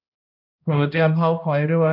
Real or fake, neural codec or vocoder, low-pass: fake; codec, 16 kHz, 1 kbps, X-Codec, HuBERT features, trained on balanced general audio; 5.4 kHz